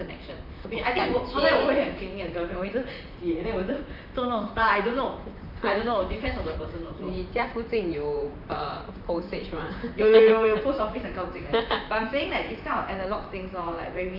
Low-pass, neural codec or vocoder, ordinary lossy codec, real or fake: 5.4 kHz; vocoder, 44.1 kHz, 128 mel bands, Pupu-Vocoder; none; fake